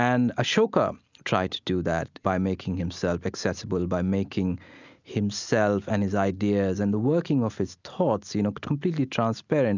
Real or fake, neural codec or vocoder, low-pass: real; none; 7.2 kHz